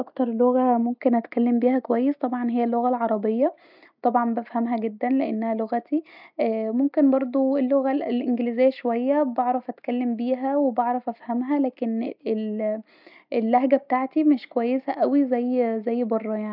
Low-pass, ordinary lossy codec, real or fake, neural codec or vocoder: 5.4 kHz; none; real; none